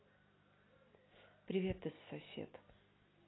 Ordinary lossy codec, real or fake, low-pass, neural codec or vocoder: AAC, 16 kbps; real; 7.2 kHz; none